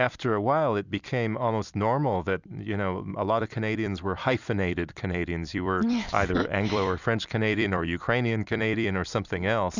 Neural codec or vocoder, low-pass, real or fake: vocoder, 44.1 kHz, 128 mel bands every 256 samples, BigVGAN v2; 7.2 kHz; fake